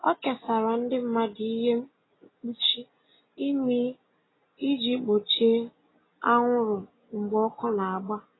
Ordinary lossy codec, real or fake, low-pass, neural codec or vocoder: AAC, 16 kbps; real; 7.2 kHz; none